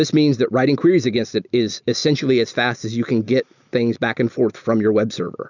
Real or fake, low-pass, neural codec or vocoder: real; 7.2 kHz; none